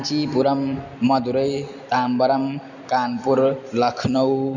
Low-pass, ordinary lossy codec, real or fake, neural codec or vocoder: 7.2 kHz; none; real; none